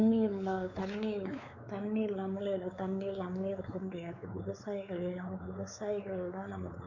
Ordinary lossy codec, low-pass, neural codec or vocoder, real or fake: none; 7.2 kHz; codec, 16 kHz, 4 kbps, X-Codec, WavLM features, trained on Multilingual LibriSpeech; fake